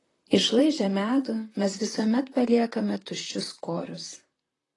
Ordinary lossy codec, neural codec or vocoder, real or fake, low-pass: AAC, 32 kbps; vocoder, 44.1 kHz, 128 mel bands, Pupu-Vocoder; fake; 10.8 kHz